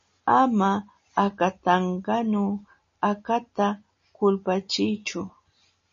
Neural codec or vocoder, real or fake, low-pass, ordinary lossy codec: none; real; 7.2 kHz; MP3, 32 kbps